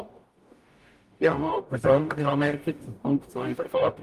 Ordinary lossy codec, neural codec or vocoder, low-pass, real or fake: Opus, 32 kbps; codec, 44.1 kHz, 0.9 kbps, DAC; 14.4 kHz; fake